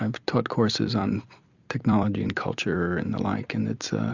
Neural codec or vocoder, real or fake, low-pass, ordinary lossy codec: vocoder, 44.1 kHz, 128 mel bands every 256 samples, BigVGAN v2; fake; 7.2 kHz; Opus, 64 kbps